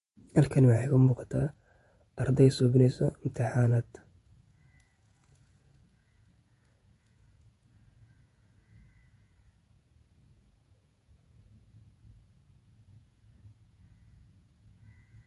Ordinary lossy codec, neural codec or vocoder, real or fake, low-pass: MP3, 48 kbps; vocoder, 48 kHz, 128 mel bands, Vocos; fake; 14.4 kHz